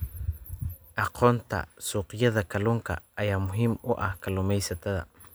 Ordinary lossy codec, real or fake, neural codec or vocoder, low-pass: none; real; none; none